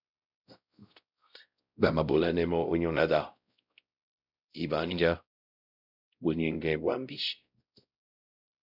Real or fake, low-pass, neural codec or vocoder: fake; 5.4 kHz; codec, 16 kHz, 0.5 kbps, X-Codec, WavLM features, trained on Multilingual LibriSpeech